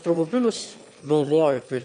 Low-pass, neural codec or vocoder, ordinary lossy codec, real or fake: 9.9 kHz; autoencoder, 22.05 kHz, a latent of 192 numbers a frame, VITS, trained on one speaker; MP3, 64 kbps; fake